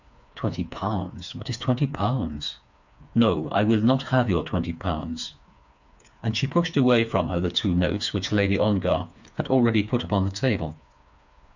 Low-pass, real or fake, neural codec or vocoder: 7.2 kHz; fake; codec, 16 kHz, 4 kbps, FreqCodec, smaller model